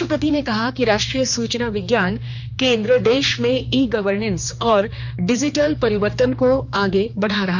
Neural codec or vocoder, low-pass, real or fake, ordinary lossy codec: codec, 16 kHz, 2 kbps, X-Codec, HuBERT features, trained on general audio; 7.2 kHz; fake; none